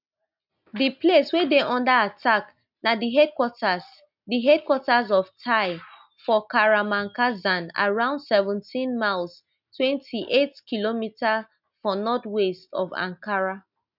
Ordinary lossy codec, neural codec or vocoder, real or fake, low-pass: none; none; real; 5.4 kHz